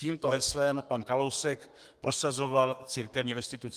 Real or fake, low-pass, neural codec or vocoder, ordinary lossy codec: fake; 14.4 kHz; codec, 32 kHz, 1.9 kbps, SNAC; Opus, 32 kbps